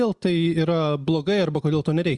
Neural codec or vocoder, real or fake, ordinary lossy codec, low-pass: none; real; Opus, 64 kbps; 10.8 kHz